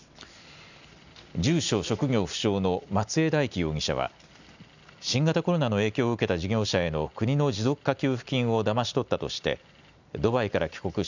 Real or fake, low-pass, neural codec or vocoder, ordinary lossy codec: real; 7.2 kHz; none; none